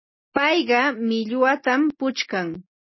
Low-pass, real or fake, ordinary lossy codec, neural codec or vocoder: 7.2 kHz; real; MP3, 24 kbps; none